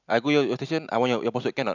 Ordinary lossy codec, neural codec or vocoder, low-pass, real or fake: none; none; 7.2 kHz; real